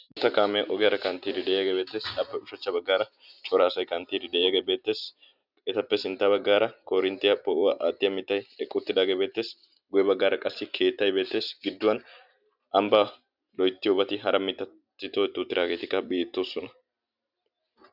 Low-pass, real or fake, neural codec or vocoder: 5.4 kHz; real; none